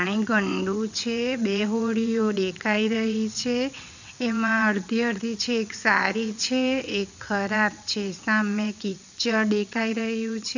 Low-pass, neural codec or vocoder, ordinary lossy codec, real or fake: 7.2 kHz; vocoder, 22.05 kHz, 80 mel bands, WaveNeXt; none; fake